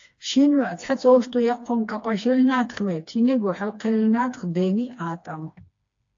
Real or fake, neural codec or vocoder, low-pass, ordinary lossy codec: fake; codec, 16 kHz, 2 kbps, FreqCodec, smaller model; 7.2 kHz; AAC, 48 kbps